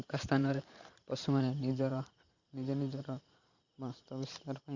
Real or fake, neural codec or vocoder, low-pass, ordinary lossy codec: fake; vocoder, 44.1 kHz, 128 mel bands every 512 samples, BigVGAN v2; 7.2 kHz; Opus, 64 kbps